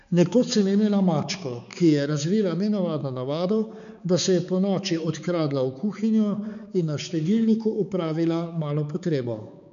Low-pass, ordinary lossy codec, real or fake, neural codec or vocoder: 7.2 kHz; none; fake; codec, 16 kHz, 4 kbps, X-Codec, HuBERT features, trained on balanced general audio